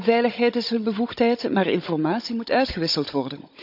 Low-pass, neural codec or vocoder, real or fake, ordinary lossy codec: 5.4 kHz; codec, 16 kHz, 16 kbps, FunCodec, trained on Chinese and English, 50 frames a second; fake; none